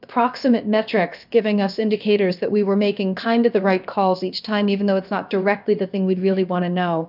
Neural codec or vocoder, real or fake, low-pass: codec, 16 kHz, about 1 kbps, DyCAST, with the encoder's durations; fake; 5.4 kHz